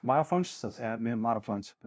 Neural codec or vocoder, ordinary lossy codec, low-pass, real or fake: codec, 16 kHz, 0.5 kbps, FunCodec, trained on LibriTTS, 25 frames a second; none; none; fake